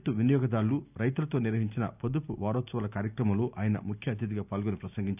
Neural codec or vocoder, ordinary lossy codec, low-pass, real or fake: none; none; 3.6 kHz; real